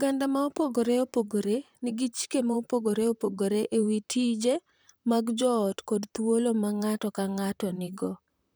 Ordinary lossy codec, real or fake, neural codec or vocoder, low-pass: none; fake; vocoder, 44.1 kHz, 128 mel bands, Pupu-Vocoder; none